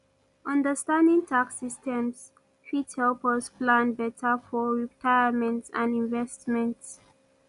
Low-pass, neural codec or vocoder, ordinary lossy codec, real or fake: 10.8 kHz; none; none; real